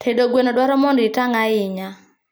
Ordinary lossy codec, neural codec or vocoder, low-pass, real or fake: none; none; none; real